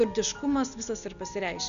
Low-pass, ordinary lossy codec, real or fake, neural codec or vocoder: 7.2 kHz; MP3, 96 kbps; real; none